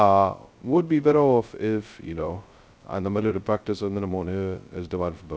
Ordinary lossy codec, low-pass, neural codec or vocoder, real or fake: none; none; codec, 16 kHz, 0.2 kbps, FocalCodec; fake